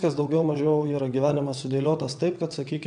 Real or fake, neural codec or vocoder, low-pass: fake; vocoder, 22.05 kHz, 80 mel bands, WaveNeXt; 9.9 kHz